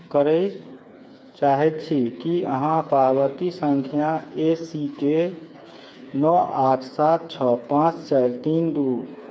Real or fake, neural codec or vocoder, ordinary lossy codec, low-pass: fake; codec, 16 kHz, 4 kbps, FreqCodec, smaller model; none; none